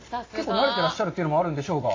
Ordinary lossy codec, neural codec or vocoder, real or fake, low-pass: AAC, 32 kbps; none; real; 7.2 kHz